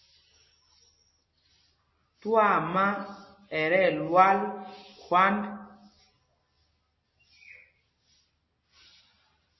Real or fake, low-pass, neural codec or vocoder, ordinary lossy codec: real; 7.2 kHz; none; MP3, 24 kbps